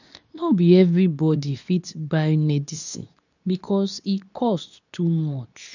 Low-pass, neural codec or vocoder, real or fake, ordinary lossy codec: 7.2 kHz; codec, 24 kHz, 0.9 kbps, WavTokenizer, medium speech release version 2; fake; none